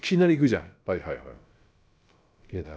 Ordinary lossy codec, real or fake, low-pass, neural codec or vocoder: none; fake; none; codec, 16 kHz, about 1 kbps, DyCAST, with the encoder's durations